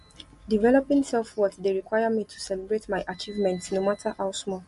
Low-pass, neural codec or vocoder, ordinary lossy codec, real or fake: 14.4 kHz; none; MP3, 48 kbps; real